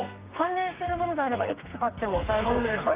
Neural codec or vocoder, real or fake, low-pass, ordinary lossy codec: codec, 32 kHz, 1.9 kbps, SNAC; fake; 3.6 kHz; Opus, 32 kbps